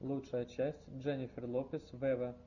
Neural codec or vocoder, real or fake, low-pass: none; real; 7.2 kHz